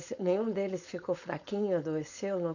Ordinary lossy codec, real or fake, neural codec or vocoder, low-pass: none; fake; codec, 16 kHz, 4.8 kbps, FACodec; 7.2 kHz